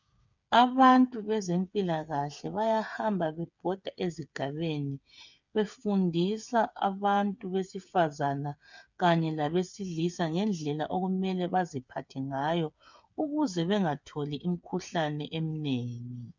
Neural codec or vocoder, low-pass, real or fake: codec, 16 kHz, 8 kbps, FreqCodec, smaller model; 7.2 kHz; fake